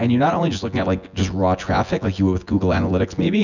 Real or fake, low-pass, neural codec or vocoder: fake; 7.2 kHz; vocoder, 24 kHz, 100 mel bands, Vocos